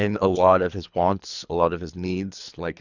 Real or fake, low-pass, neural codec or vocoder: fake; 7.2 kHz; codec, 24 kHz, 3 kbps, HILCodec